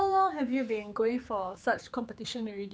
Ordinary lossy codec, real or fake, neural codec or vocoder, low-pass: none; fake; codec, 16 kHz, 4 kbps, X-Codec, HuBERT features, trained on general audio; none